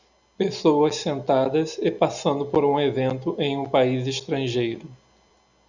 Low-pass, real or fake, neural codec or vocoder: 7.2 kHz; real; none